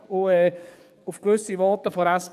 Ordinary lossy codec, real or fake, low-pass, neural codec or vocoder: none; fake; 14.4 kHz; codec, 44.1 kHz, 7.8 kbps, DAC